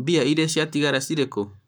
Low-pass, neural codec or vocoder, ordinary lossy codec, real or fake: none; codec, 44.1 kHz, 7.8 kbps, Pupu-Codec; none; fake